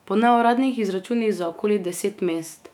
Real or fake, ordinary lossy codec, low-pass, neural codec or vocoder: fake; none; 19.8 kHz; autoencoder, 48 kHz, 128 numbers a frame, DAC-VAE, trained on Japanese speech